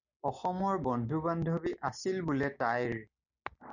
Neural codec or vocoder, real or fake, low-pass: none; real; 7.2 kHz